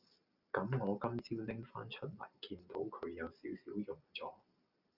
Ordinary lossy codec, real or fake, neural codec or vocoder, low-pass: Opus, 64 kbps; real; none; 5.4 kHz